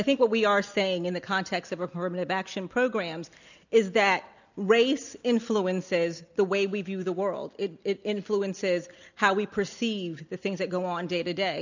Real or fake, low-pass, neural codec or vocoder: real; 7.2 kHz; none